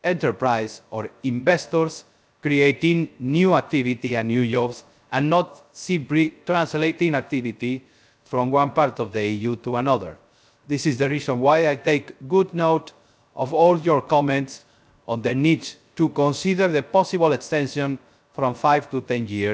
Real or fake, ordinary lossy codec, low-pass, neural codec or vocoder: fake; none; none; codec, 16 kHz, 0.3 kbps, FocalCodec